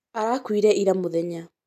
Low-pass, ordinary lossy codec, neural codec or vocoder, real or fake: 14.4 kHz; none; none; real